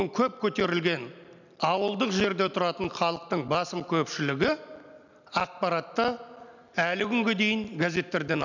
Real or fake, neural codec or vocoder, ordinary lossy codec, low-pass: real; none; none; 7.2 kHz